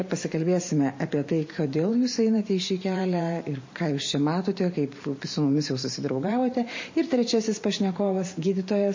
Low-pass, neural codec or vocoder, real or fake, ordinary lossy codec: 7.2 kHz; vocoder, 44.1 kHz, 128 mel bands every 512 samples, BigVGAN v2; fake; MP3, 32 kbps